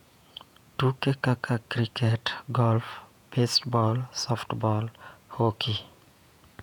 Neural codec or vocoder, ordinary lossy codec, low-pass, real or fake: vocoder, 48 kHz, 128 mel bands, Vocos; none; 19.8 kHz; fake